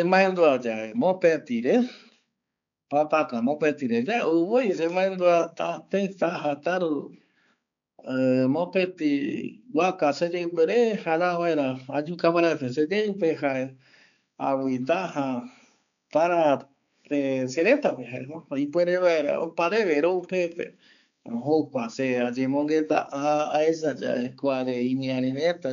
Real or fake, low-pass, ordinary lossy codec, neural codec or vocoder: fake; 7.2 kHz; none; codec, 16 kHz, 4 kbps, X-Codec, HuBERT features, trained on balanced general audio